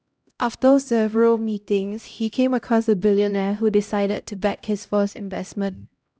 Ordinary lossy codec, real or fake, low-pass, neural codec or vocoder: none; fake; none; codec, 16 kHz, 0.5 kbps, X-Codec, HuBERT features, trained on LibriSpeech